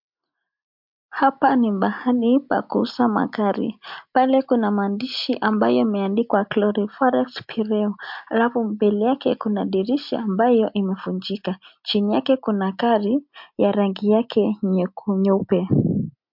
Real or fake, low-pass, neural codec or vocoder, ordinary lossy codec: real; 5.4 kHz; none; MP3, 48 kbps